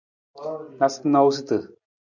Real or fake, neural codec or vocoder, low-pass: real; none; 7.2 kHz